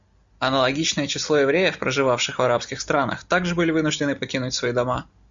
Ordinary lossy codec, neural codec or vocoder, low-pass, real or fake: Opus, 64 kbps; none; 7.2 kHz; real